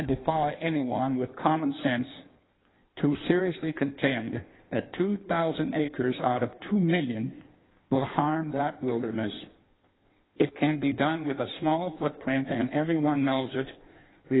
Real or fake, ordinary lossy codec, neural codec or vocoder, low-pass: fake; AAC, 16 kbps; codec, 16 kHz in and 24 kHz out, 1.1 kbps, FireRedTTS-2 codec; 7.2 kHz